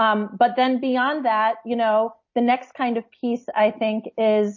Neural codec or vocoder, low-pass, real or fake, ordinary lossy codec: none; 7.2 kHz; real; MP3, 32 kbps